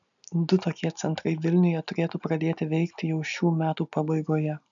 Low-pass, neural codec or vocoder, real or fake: 7.2 kHz; none; real